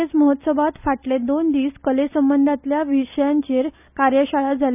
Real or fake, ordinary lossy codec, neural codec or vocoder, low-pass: real; none; none; 3.6 kHz